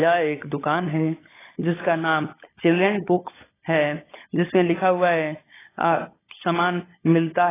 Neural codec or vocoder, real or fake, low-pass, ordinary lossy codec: codec, 16 kHz, 16 kbps, FunCodec, trained on LibriTTS, 50 frames a second; fake; 3.6 kHz; AAC, 16 kbps